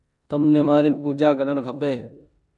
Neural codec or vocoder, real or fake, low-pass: codec, 16 kHz in and 24 kHz out, 0.9 kbps, LongCat-Audio-Codec, four codebook decoder; fake; 10.8 kHz